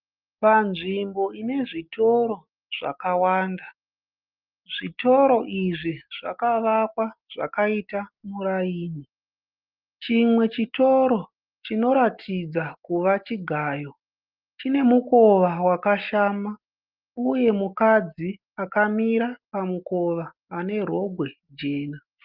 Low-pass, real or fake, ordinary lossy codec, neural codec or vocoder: 5.4 kHz; real; Opus, 24 kbps; none